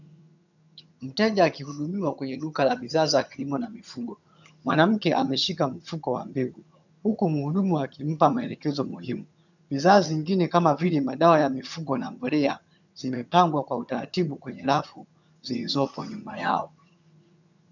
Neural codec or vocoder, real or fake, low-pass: vocoder, 22.05 kHz, 80 mel bands, HiFi-GAN; fake; 7.2 kHz